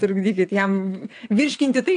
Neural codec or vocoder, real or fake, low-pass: vocoder, 22.05 kHz, 80 mel bands, WaveNeXt; fake; 9.9 kHz